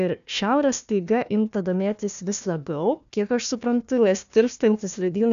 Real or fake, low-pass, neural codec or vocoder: fake; 7.2 kHz; codec, 16 kHz, 1 kbps, FunCodec, trained on Chinese and English, 50 frames a second